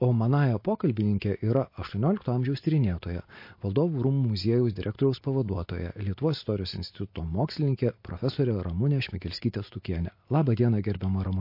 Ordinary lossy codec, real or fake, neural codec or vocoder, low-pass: MP3, 32 kbps; real; none; 5.4 kHz